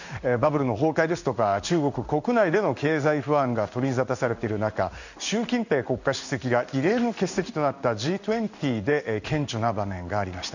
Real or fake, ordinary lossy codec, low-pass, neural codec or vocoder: fake; none; 7.2 kHz; codec, 16 kHz in and 24 kHz out, 1 kbps, XY-Tokenizer